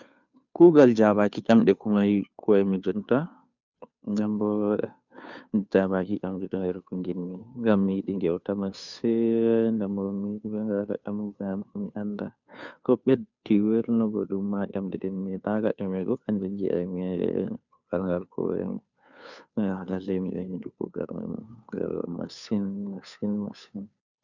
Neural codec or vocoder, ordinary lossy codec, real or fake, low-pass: codec, 16 kHz, 2 kbps, FunCodec, trained on Chinese and English, 25 frames a second; none; fake; 7.2 kHz